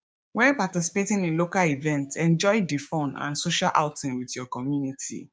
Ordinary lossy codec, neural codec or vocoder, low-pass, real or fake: none; codec, 16 kHz, 6 kbps, DAC; none; fake